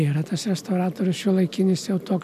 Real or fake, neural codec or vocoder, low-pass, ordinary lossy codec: real; none; 14.4 kHz; AAC, 96 kbps